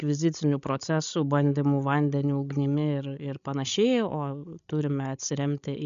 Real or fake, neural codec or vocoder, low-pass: fake; codec, 16 kHz, 16 kbps, FreqCodec, larger model; 7.2 kHz